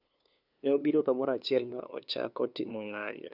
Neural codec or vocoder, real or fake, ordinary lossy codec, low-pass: codec, 16 kHz, 2 kbps, FunCodec, trained on LibriTTS, 25 frames a second; fake; none; 5.4 kHz